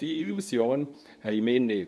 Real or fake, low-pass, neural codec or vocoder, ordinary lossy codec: fake; none; codec, 24 kHz, 0.9 kbps, WavTokenizer, medium speech release version 2; none